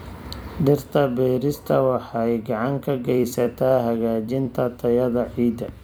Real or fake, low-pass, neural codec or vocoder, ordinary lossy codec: fake; none; vocoder, 44.1 kHz, 128 mel bands every 256 samples, BigVGAN v2; none